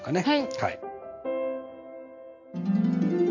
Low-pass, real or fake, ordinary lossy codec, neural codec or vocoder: 7.2 kHz; real; none; none